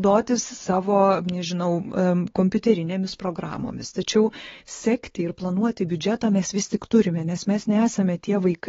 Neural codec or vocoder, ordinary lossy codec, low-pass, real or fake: codec, 16 kHz, 4 kbps, X-Codec, WavLM features, trained on Multilingual LibriSpeech; AAC, 24 kbps; 7.2 kHz; fake